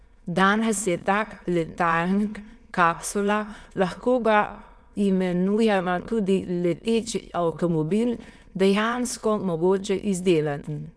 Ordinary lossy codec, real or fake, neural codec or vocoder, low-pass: none; fake; autoencoder, 22.05 kHz, a latent of 192 numbers a frame, VITS, trained on many speakers; none